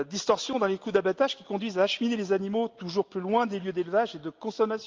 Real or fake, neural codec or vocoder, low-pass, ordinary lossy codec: real; none; 7.2 kHz; Opus, 32 kbps